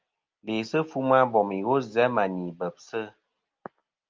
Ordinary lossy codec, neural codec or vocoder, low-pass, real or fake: Opus, 24 kbps; none; 7.2 kHz; real